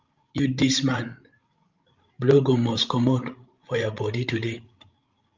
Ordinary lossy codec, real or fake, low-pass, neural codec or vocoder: Opus, 32 kbps; fake; 7.2 kHz; codec, 16 kHz, 16 kbps, FreqCodec, larger model